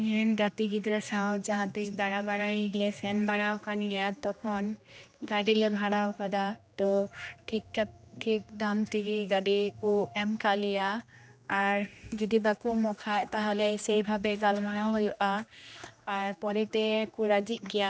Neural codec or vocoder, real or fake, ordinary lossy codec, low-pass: codec, 16 kHz, 1 kbps, X-Codec, HuBERT features, trained on general audio; fake; none; none